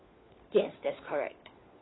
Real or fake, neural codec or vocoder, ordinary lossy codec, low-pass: fake; codec, 16 kHz, 4 kbps, FunCodec, trained on LibriTTS, 50 frames a second; AAC, 16 kbps; 7.2 kHz